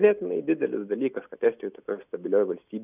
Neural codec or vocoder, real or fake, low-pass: none; real; 3.6 kHz